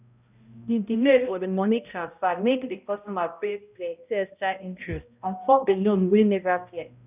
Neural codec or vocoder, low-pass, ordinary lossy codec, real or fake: codec, 16 kHz, 0.5 kbps, X-Codec, HuBERT features, trained on balanced general audio; 3.6 kHz; none; fake